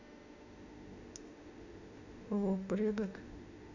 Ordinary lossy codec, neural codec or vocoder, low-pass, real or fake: none; autoencoder, 48 kHz, 32 numbers a frame, DAC-VAE, trained on Japanese speech; 7.2 kHz; fake